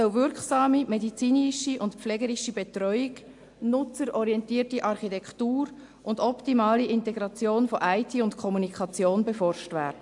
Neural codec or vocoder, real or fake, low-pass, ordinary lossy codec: none; real; 10.8 kHz; AAC, 64 kbps